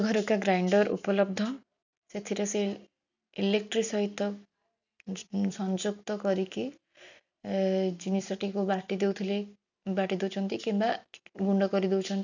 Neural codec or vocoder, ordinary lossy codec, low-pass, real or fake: none; none; 7.2 kHz; real